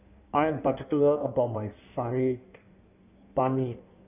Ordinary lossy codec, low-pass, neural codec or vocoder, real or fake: none; 3.6 kHz; codec, 16 kHz in and 24 kHz out, 1.1 kbps, FireRedTTS-2 codec; fake